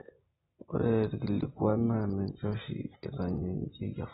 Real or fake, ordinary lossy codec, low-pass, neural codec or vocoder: real; AAC, 16 kbps; 7.2 kHz; none